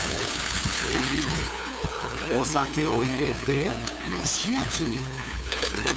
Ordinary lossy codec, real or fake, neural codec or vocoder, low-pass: none; fake; codec, 16 kHz, 2 kbps, FunCodec, trained on LibriTTS, 25 frames a second; none